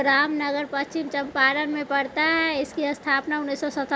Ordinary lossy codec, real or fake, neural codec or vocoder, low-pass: none; real; none; none